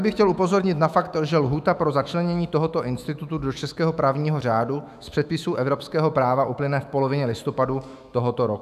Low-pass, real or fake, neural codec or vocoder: 14.4 kHz; fake; autoencoder, 48 kHz, 128 numbers a frame, DAC-VAE, trained on Japanese speech